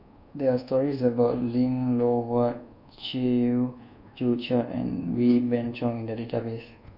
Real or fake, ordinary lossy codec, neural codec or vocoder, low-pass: fake; none; codec, 24 kHz, 1.2 kbps, DualCodec; 5.4 kHz